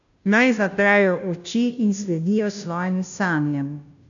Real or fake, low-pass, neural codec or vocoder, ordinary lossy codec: fake; 7.2 kHz; codec, 16 kHz, 0.5 kbps, FunCodec, trained on Chinese and English, 25 frames a second; none